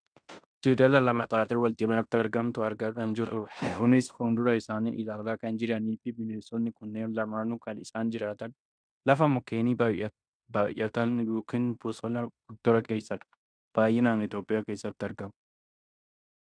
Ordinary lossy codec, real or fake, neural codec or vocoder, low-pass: MP3, 96 kbps; fake; codec, 16 kHz in and 24 kHz out, 0.9 kbps, LongCat-Audio-Codec, fine tuned four codebook decoder; 9.9 kHz